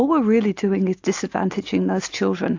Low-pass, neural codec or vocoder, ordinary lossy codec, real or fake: 7.2 kHz; none; AAC, 48 kbps; real